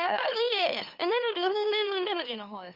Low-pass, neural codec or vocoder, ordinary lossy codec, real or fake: 5.4 kHz; autoencoder, 44.1 kHz, a latent of 192 numbers a frame, MeloTTS; Opus, 32 kbps; fake